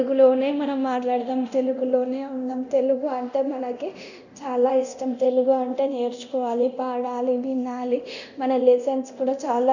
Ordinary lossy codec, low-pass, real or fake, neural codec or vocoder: none; 7.2 kHz; fake; codec, 24 kHz, 0.9 kbps, DualCodec